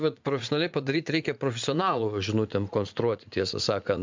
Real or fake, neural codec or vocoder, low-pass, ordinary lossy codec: fake; vocoder, 44.1 kHz, 80 mel bands, Vocos; 7.2 kHz; MP3, 64 kbps